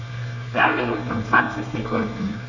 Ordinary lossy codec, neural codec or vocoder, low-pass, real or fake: none; codec, 24 kHz, 1 kbps, SNAC; 7.2 kHz; fake